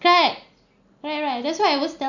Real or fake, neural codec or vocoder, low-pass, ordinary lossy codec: real; none; 7.2 kHz; none